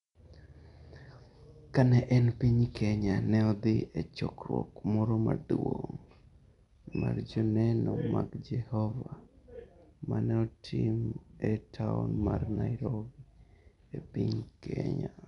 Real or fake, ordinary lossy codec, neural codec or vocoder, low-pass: real; none; none; 10.8 kHz